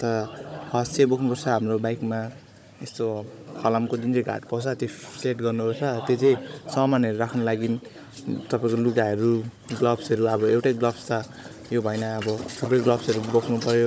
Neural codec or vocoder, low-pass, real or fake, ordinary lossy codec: codec, 16 kHz, 16 kbps, FunCodec, trained on Chinese and English, 50 frames a second; none; fake; none